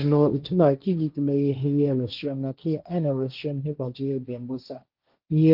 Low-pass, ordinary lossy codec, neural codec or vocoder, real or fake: 5.4 kHz; Opus, 32 kbps; codec, 16 kHz, 1.1 kbps, Voila-Tokenizer; fake